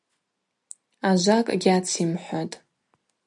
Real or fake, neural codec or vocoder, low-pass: real; none; 10.8 kHz